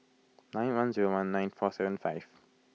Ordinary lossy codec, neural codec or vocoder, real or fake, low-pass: none; none; real; none